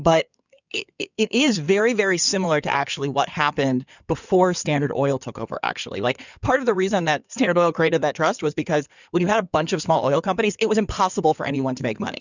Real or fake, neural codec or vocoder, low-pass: fake; codec, 16 kHz in and 24 kHz out, 2.2 kbps, FireRedTTS-2 codec; 7.2 kHz